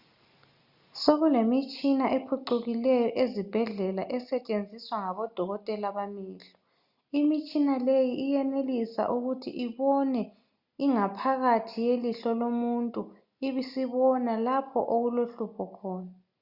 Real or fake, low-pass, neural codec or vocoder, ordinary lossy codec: real; 5.4 kHz; none; Opus, 64 kbps